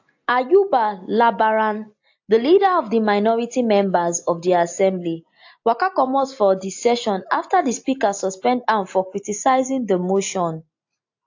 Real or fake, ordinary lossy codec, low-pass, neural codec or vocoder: real; AAC, 48 kbps; 7.2 kHz; none